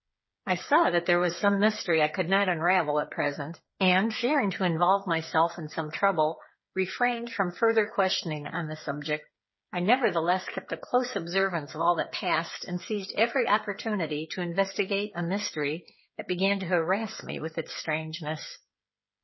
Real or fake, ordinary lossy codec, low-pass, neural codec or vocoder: fake; MP3, 24 kbps; 7.2 kHz; codec, 16 kHz, 8 kbps, FreqCodec, smaller model